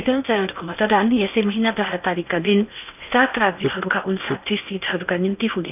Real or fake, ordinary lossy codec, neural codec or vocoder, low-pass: fake; none; codec, 16 kHz in and 24 kHz out, 0.8 kbps, FocalCodec, streaming, 65536 codes; 3.6 kHz